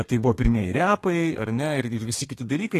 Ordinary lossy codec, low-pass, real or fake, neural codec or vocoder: AAC, 48 kbps; 14.4 kHz; fake; codec, 32 kHz, 1.9 kbps, SNAC